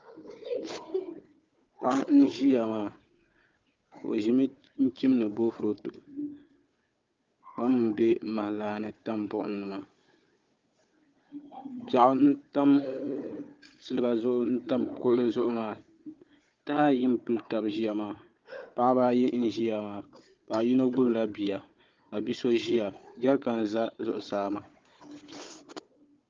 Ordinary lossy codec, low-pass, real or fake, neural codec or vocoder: Opus, 24 kbps; 7.2 kHz; fake; codec, 16 kHz, 4 kbps, FunCodec, trained on Chinese and English, 50 frames a second